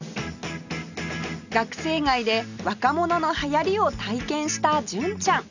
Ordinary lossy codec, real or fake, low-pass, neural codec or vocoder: none; real; 7.2 kHz; none